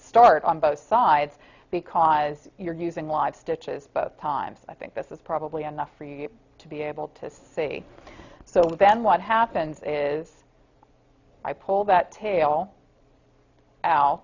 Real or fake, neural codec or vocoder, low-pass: real; none; 7.2 kHz